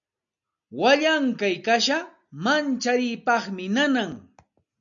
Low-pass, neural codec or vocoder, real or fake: 7.2 kHz; none; real